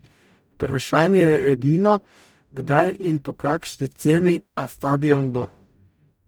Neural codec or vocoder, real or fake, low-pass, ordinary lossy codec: codec, 44.1 kHz, 0.9 kbps, DAC; fake; none; none